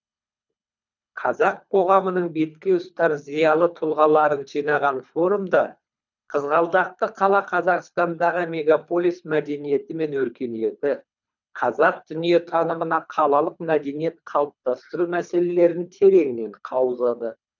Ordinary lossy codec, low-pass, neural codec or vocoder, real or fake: none; 7.2 kHz; codec, 24 kHz, 3 kbps, HILCodec; fake